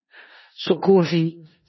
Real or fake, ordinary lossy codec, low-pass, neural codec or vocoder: fake; MP3, 24 kbps; 7.2 kHz; codec, 16 kHz in and 24 kHz out, 0.4 kbps, LongCat-Audio-Codec, four codebook decoder